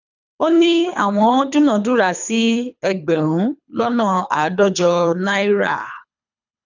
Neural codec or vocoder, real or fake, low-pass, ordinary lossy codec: codec, 24 kHz, 3 kbps, HILCodec; fake; 7.2 kHz; none